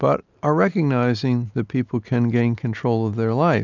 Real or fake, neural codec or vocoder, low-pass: real; none; 7.2 kHz